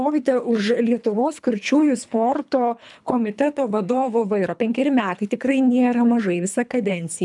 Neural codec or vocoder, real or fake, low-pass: codec, 24 kHz, 3 kbps, HILCodec; fake; 10.8 kHz